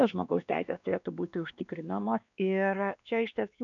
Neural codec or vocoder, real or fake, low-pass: codec, 16 kHz, 2 kbps, X-Codec, WavLM features, trained on Multilingual LibriSpeech; fake; 7.2 kHz